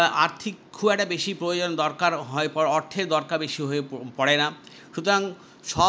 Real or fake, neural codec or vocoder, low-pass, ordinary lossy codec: real; none; none; none